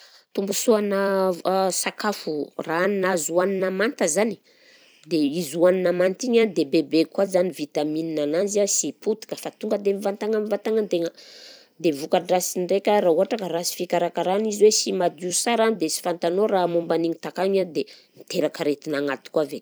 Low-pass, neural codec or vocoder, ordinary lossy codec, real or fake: none; vocoder, 44.1 kHz, 128 mel bands every 256 samples, BigVGAN v2; none; fake